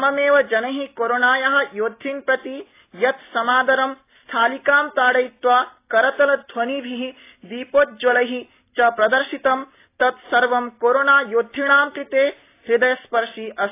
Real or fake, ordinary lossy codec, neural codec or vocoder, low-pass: real; AAC, 24 kbps; none; 3.6 kHz